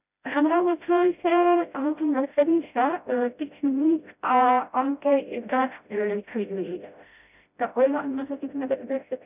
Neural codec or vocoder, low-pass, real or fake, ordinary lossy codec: codec, 16 kHz, 0.5 kbps, FreqCodec, smaller model; 3.6 kHz; fake; none